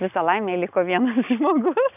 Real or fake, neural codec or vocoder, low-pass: real; none; 3.6 kHz